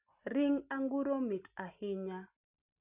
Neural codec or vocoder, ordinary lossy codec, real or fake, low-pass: none; none; real; 3.6 kHz